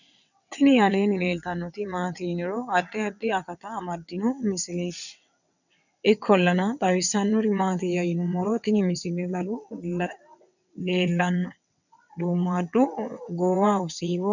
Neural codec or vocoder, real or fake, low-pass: vocoder, 22.05 kHz, 80 mel bands, WaveNeXt; fake; 7.2 kHz